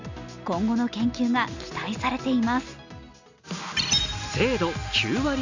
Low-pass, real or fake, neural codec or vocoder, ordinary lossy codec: 7.2 kHz; real; none; Opus, 64 kbps